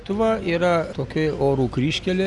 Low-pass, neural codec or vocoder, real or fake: 10.8 kHz; none; real